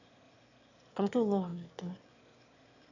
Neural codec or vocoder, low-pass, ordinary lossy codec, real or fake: autoencoder, 22.05 kHz, a latent of 192 numbers a frame, VITS, trained on one speaker; 7.2 kHz; AAC, 48 kbps; fake